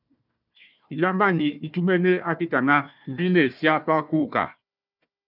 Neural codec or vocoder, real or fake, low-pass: codec, 16 kHz, 1 kbps, FunCodec, trained on Chinese and English, 50 frames a second; fake; 5.4 kHz